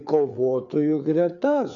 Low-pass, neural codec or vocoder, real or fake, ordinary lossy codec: 7.2 kHz; codec, 16 kHz, 4 kbps, FreqCodec, larger model; fake; MP3, 96 kbps